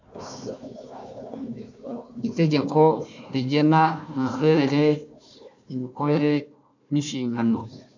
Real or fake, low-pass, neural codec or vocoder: fake; 7.2 kHz; codec, 16 kHz, 1 kbps, FunCodec, trained on Chinese and English, 50 frames a second